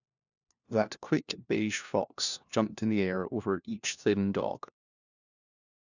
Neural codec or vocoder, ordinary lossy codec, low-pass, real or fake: codec, 16 kHz, 1 kbps, FunCodec, trained on LibriTTS, 50 frames a second; none; 7.2 kHz; fake